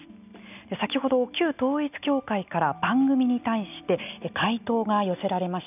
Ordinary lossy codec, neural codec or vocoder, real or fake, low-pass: none; none; real; 3.6 kHz